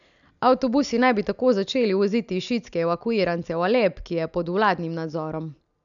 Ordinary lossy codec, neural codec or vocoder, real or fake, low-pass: none; none; real; 7.2 kHz